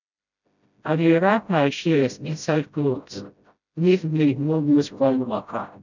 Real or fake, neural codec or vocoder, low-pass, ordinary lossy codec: fake; codec, 16 kHz, 0.5 kbps, FreqCodec, smaller model; 7.2 kHz; none